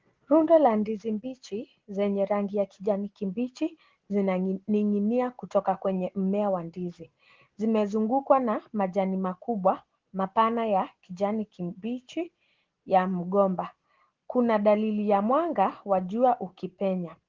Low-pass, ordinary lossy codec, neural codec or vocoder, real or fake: 7.2 kHz; Opus, 16 kbps; none; real